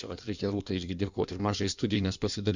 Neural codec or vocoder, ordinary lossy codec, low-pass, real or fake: codec, 16 kHz in and 24 kHz out, 1.1 kbps, FireRedTTS-2 codec; Opus, 64 kbps; 7.2 kHz; fake